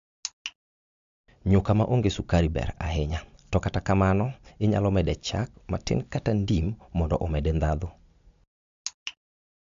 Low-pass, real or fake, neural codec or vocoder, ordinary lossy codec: 7.2 kHz; real; none; MP3, 64 kbps